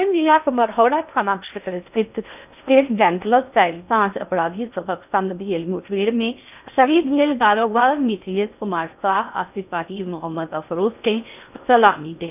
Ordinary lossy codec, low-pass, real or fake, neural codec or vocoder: none; 3.6 kHz; fake; codec, 16 kHz in and 24 kHz out, 0.6 kbps, FocalCodec, streaming, 2048 codes